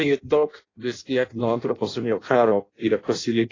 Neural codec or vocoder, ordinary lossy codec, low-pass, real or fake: codec, 16 kHz in and 24 kHz out, 0.6 kbps, FireRedTTS-2 codec; AAC, 32 kbps; 7.2 kHz; fake